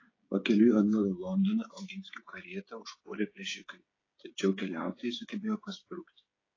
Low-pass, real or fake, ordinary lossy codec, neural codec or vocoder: 7.2 kHz; fake; AAC, 32 kbps; codec, 16 kHz, 16 kbps, FreqCodec, smaller model